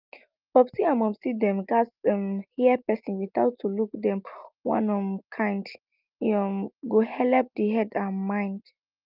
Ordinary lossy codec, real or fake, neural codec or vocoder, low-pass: Opus, 24 kbps; real; none; 5.4 kHz